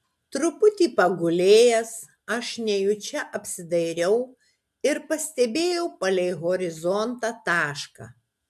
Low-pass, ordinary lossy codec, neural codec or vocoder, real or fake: 14.4 kHz; AAC, 96 kbps; none; real